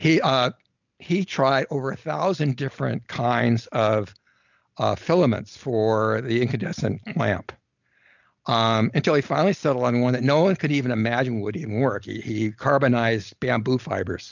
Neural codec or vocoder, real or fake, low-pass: none; real; 7.2 kHz